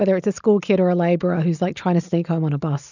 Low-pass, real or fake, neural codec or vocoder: 7.2 kHz; real; none